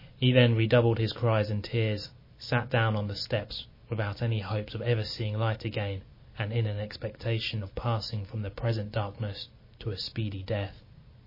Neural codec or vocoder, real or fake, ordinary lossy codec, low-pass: none; real; MP3, 24 kbps; 5.4 kHz